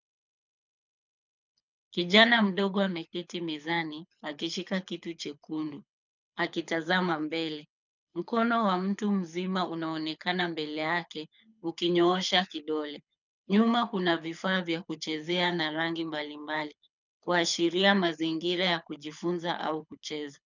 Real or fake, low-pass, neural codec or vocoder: fake; 7.2 kHz; codec, 24 kHz, 6 kbps, HILCodec